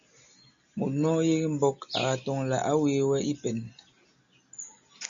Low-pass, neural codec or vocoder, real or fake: 7.2 kHz; none; real